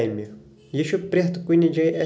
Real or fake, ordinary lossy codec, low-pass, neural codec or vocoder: real; none; none; none